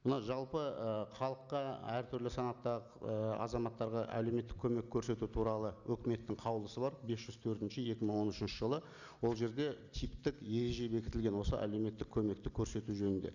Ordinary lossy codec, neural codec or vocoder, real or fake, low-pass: none; none; real; 7.2 kHz